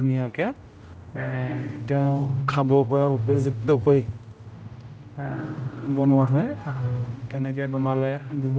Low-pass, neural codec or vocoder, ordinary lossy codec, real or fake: none; codec, 16 kHz, 0.5 kbps, X-Codec, HuBERT features, trained on general audio; none; fake